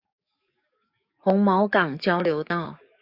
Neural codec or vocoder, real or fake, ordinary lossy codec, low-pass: codec, 44.1 kHz, 7.8 kbps, DAC; fake; Opus, 64 kbps; 5.4 kHz